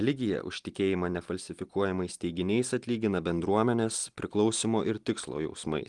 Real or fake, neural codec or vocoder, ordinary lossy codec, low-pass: real; none; Opus, 24 kbps; 10.8 kHz